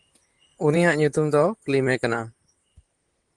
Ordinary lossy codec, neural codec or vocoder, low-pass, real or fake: Opus, 24 kbps; vocoder, 44.1 kHz, 128 mel bands, Pupu-Vocoder; 10.8 kHz; fake